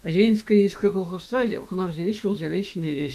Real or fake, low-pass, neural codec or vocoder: fake; 14.4 kHz; autoencoder, 48 kHz, 32 numbers a frame, DAC-VAE, trained on Japanese speech